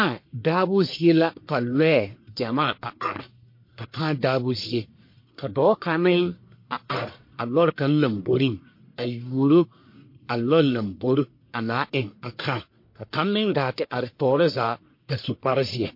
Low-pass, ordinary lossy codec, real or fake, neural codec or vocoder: 5.4 kHz; MP3, 32 kbps; fake; codec, 44.1 kHz, 1.7 kbps, Pupu-Codec